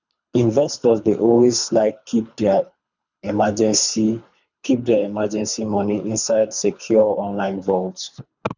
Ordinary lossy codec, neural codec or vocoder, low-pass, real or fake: none; codec, 24 kHz, 3 kbps, HILCodec; 7.2 kHz; fake